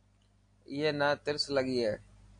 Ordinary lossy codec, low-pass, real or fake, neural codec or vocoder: AAC, 48 kbps; 9.9 kHz; real; none